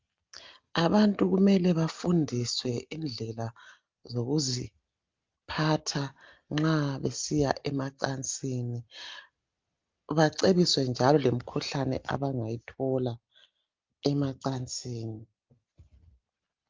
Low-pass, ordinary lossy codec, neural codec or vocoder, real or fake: 7.2 kHz; Opus, 32 kbps; none; real